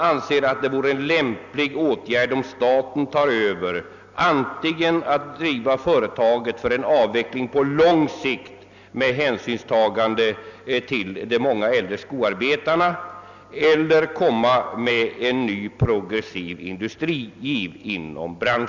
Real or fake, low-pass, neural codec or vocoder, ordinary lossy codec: real; 7.2 kHz; none; none